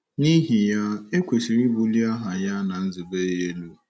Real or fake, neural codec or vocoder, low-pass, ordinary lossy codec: real; none; none; none